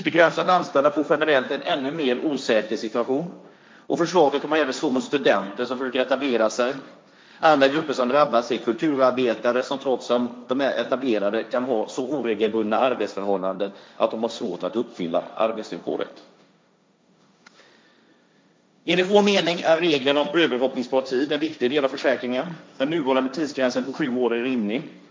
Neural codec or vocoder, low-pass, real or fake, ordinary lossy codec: codec, 16 kHz, 1.1 kbps, Voila-Tokenizer; none; fake; none